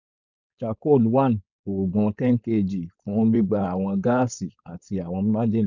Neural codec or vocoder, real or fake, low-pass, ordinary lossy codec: codec, 16 kHz, 4.8 kbps, FACodec; fake; 7.2 kHz; none